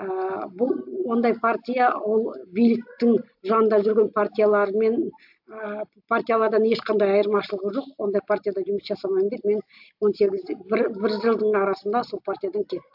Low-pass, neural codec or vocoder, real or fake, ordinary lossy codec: 5.4 kHz; none; real; AAC, 48 kbps